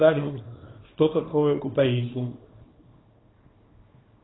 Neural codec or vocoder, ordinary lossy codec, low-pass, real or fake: codec, 24 kHz, 0.9 kbps, WavTokenizer, small release; AAC, 16 kbps; 7.2 kHz; fake